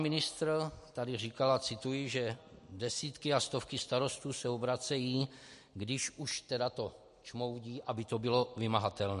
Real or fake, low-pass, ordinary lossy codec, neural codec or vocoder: real; 14.4 kHz; MP3, 48 kbps; none